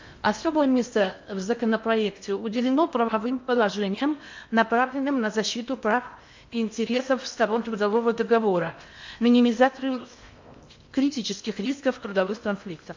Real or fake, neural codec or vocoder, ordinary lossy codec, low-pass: fake; codec, 16 kHz in and 24 kHz out, 0.8 kbps, FocalCodec, streaming, 65536 codes; MP3, 48 kbps; 7.2 kHz